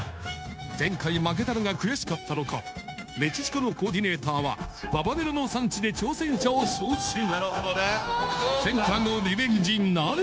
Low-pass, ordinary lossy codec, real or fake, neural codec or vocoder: none; none; fake; codec, 16 kHz, 0.9 kbps, LongCat-Audio-Codec